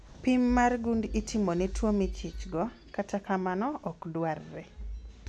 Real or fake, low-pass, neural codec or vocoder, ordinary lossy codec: real; none; none; none